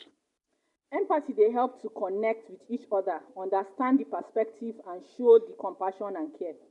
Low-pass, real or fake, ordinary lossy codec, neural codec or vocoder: 10.8 kHz; real; none; none